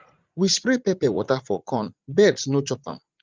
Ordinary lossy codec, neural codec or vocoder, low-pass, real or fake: Opus, 32 kbps; vocoder, 44.1 kHz, 80 mel bands, Vocos; 7.2 kHz; fake